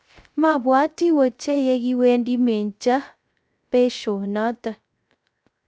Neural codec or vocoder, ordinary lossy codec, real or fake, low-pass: codec, 16 kHz, 0.3 kbps, FocalCodec; none; fake; none